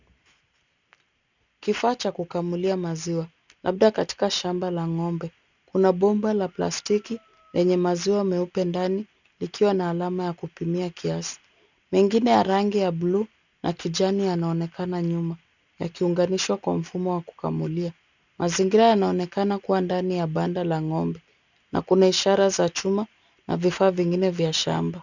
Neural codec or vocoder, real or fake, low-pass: none; real; 7.2 kHz